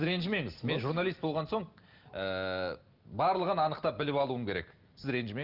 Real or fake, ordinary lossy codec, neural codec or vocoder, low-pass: real; Opus, 32 kbps; none; 5.4 kHz